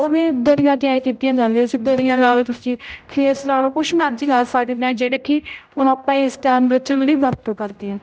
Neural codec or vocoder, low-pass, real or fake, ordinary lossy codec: codec, 16 kHz, 0.5 kbps, X-Codec, HuBERT features, trained on general audio; none; fake; none